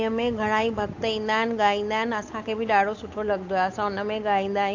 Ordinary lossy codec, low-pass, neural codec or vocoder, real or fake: AAC, 48 kbps; 7.2 kHz; codec, 16 kHz, 8 kbps, FunCodec, trained on Chinese and English, 25 frames a second; fake